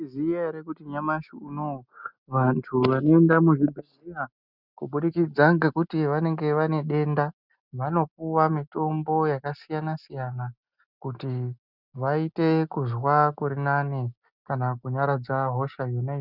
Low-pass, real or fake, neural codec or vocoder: 5.4 kHz; real; none